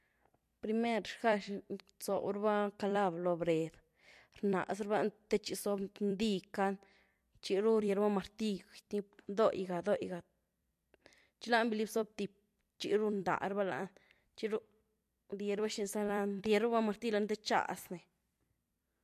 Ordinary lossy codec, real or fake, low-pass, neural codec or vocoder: MP3, 64 kbps; fake; 14.4 kHz; vocoder, 44.1 kHz, 128 mel bands every 256 samples, BigVGAN v2